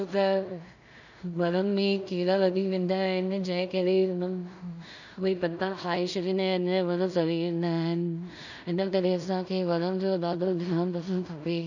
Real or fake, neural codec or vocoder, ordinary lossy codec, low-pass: fake; codec, 16 kHz in and 24 kHz out, 0.4 kbps, LongCat-Audio-Codec, two codebook decoder; none; 7.2 kHz